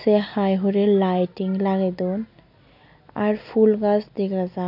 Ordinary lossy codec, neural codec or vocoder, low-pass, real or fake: none; none; 5.4 kHz; real